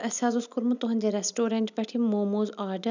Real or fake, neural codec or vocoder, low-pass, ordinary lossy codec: real; none; 7.2 kHz; none